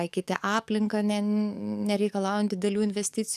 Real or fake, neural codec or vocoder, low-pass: fake; codec, 44.1 kHz, 7.8 kbps, DAC; 14.4 kHz